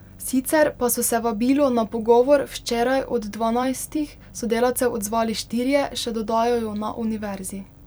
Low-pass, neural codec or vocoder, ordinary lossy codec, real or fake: none; vocoder, 44.1 kHz, 128 mel bands every 256 samples, BigVGAN v2; none; fake